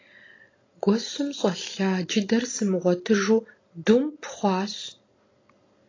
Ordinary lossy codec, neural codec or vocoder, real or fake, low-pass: AAC, 32 kbps; none; real; 7.2 kHz